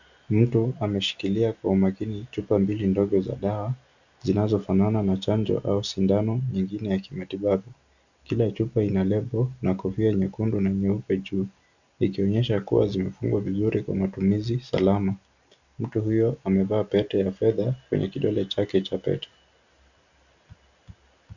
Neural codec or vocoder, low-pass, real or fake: none; 7.2 kHz; real